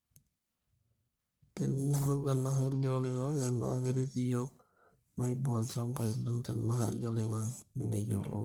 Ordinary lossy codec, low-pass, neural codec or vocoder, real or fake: none; none; codec, 44.1 kHz, 1.7 kbps, Pupu-Codec; fake